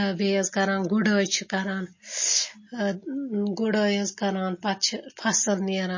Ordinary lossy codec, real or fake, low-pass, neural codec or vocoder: MP3, 32 kbps; real; 7.2 kHz; none